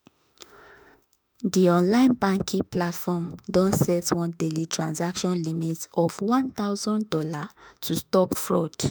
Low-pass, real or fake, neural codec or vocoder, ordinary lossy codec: none; fake; autoencoder, 48 kHz, 32 numbers a frame, DAC-VAE, trained on Japanese speech; none